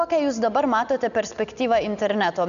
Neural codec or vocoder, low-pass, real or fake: none; 7.2 kHz; real